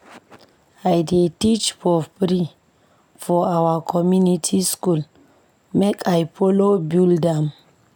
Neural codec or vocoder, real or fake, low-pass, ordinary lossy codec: none; real; none; none